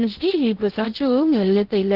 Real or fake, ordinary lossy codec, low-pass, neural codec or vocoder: fake; Opus, 16 kbps; 5.4 kHz; codec, 16 kHz in and 24 kHz out, 0.6 kbps, FocalCodec, streaming, 2048 codes